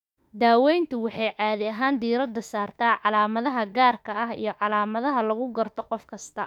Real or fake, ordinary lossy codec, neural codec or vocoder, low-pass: fake; none; autoencoder, 48 kHz, 32 numbers a frame, DAC-VAE, trained on Japanese speech; 19.8 kHz